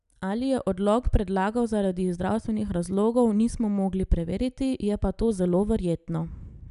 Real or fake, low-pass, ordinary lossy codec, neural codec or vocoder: real; 10.8 kHz; none; none